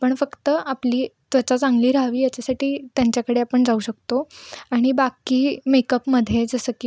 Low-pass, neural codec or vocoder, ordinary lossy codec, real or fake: none; none; none; real